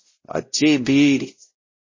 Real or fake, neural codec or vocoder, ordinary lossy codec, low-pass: fake; codec, 16 kHz, 0.5 kbps, X-Codec, WavLM features, trained on Multilingual LibriSpeech; MP3, 32 kbps; 7.2 kHz